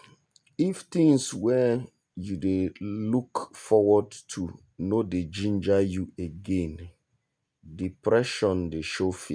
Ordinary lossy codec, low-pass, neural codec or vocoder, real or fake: none; 9.9 kHz; none; real